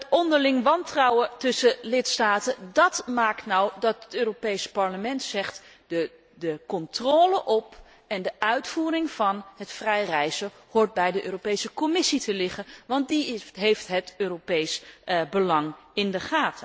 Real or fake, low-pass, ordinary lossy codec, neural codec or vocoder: real; none; none; none